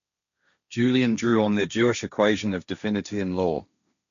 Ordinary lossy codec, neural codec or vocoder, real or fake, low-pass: none; codec, 16 kHz, 1.1 kbps, Voila-Tokenizer; fake; 7.2 kHz